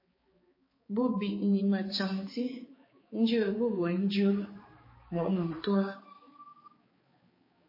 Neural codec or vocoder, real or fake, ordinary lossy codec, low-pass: codec, 16 kHz, 4 kbps, X-Codec, HuBERT features, trained on balanced general audio; fake; MP3, 24 kbps; 5.4 kHz